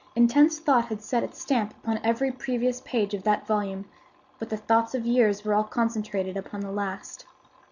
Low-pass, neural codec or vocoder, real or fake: 7.2 kHz; none; real